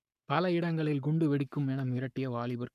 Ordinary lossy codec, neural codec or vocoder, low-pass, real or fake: MP3, 96 kbps; codec, 44.1 kHz, 7.8 kbps, Pupu-Codec; 14.4 kHz; fake